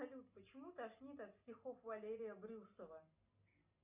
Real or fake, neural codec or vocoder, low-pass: real; none; 3.6 kHz